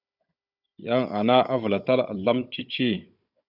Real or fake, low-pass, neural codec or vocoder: fake; 5.4 kHz; codec, 16 kHz, 16 kbps, FunCodec, trained on Chinese and English, 50 frames a second